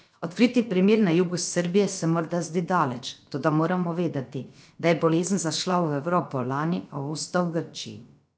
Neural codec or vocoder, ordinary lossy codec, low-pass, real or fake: codec, 16 kHz, about 1 kbps, DyCAST, with the encoder's durations; none; none; fake